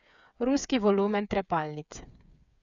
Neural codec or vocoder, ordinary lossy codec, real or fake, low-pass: codec, 16 kHz, 8 kbps, FreqCodec, smaller model; none; fake; 7.2 kHz